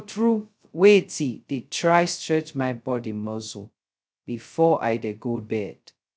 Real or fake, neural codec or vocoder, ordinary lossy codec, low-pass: fake; codec, 16 kHz, 0.2 kbps, FocalCodec; none; none